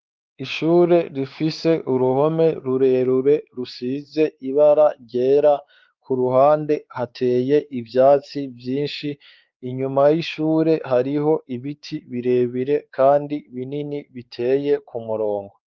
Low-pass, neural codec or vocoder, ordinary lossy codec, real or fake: 7.2 kHz; codec, 16 kHz, 4 kbps, X-Codec, WavLM features, trained on Multilingual LibriSpeech; Opus, 32 kbps; fake